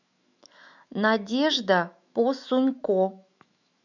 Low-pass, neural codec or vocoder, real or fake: 7.2 kHz; autoencoder, 48 kHz, 128 numbers a frame, DAC-VAE, trained on Japanese speech; fake